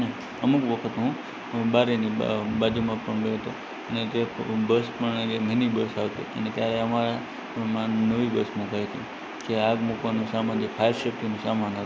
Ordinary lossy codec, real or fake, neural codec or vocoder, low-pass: none; real; none; none